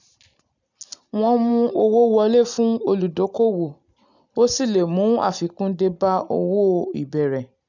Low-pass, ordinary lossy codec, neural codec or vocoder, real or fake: 7.2 kHz; none; none; real